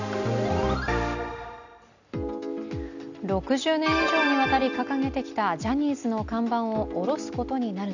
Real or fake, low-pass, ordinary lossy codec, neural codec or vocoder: real; 7.2 kHz; none; none